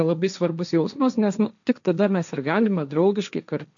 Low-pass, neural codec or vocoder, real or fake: 7.2 kHz; codec, 16 kHz, 1.1 kbps, Voila-Tokenizer; fake